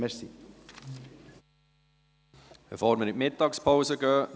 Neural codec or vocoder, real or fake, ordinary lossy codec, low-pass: none; real; none; none